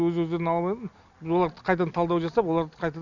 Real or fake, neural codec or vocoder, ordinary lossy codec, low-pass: real; none; none; 7.2 kHz